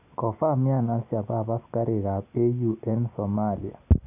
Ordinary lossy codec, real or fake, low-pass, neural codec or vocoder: none; real; 3.6 kHz; none